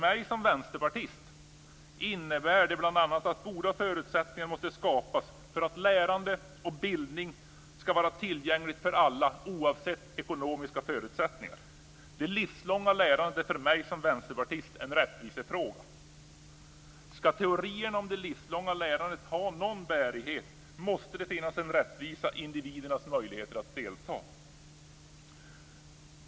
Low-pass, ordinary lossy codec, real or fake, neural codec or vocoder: none; none; real; none